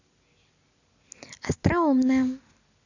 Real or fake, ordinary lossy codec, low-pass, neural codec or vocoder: real; none; 7.2 kHz; none